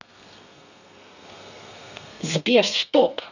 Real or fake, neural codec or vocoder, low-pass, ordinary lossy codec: fake; codec, 32 kHz, 1.9 kbps, SNAC; 7.2 kHz; none